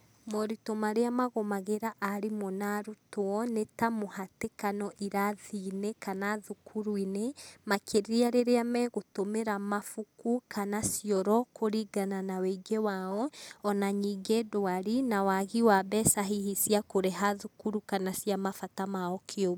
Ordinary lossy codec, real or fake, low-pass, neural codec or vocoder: none; real; none; none